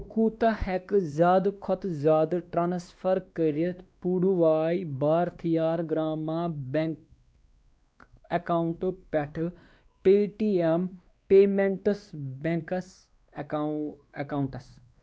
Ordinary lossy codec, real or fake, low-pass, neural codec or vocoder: none; fake; none; codec, 16 kHz, 2 kbps, X-Codec, WavLM features, trained on Multilingual LibriSpeech